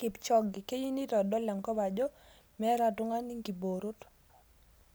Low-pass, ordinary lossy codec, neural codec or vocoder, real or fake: none; none; none; real